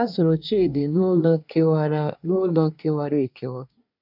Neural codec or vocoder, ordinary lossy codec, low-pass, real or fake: codec, 24 kHz, 1 kbps, SNAC; none; 5.4 kHz; fake